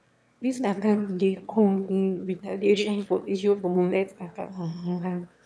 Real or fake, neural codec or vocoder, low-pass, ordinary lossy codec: fake; autoencoder, 22.05 kHz, a latent of 192 numbers a frame, VITS, trained on one speaker; none; none